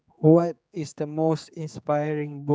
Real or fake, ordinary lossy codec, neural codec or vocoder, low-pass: fake; none; codec, 16 kHz, 4 kbps, X-Codec, HuBERT features, trained on general audio; none